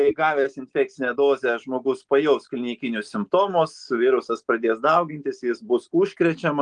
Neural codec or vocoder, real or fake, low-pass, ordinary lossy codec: none; real; 9.9 kHz; Opus, 24 kbps